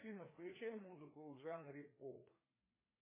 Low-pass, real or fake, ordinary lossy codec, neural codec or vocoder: 3.6 kHz; fake; MP3, 16 kbps; codec, 16 kHz, 2 kbps, FreqCodec, larger model